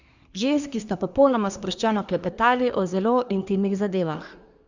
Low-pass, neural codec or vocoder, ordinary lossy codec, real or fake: 7.2 kHz; codec, 24 kHz, 1 kbps, SNAC; Opus, 64 kbps; fake